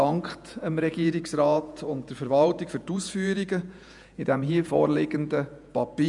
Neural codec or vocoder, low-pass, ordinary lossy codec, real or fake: vocoder, 48 kHz, 128 mel bands, Vocos; 10.8 kHz; none; fake